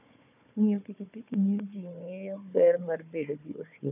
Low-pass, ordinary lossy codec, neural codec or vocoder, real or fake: 3.6 kHz; none; codec, 16 kHz, 4 kbps, FunCodec, trained on Chinese and English, 50 frames a second; fake